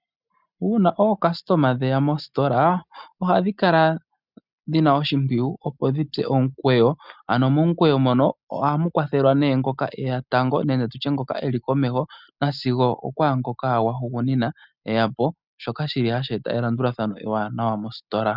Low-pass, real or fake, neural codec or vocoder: 5.4 kHz; real; none